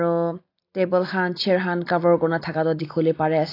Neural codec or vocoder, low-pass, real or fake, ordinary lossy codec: none; 5.4 kHz; real; AAC, 32 kbps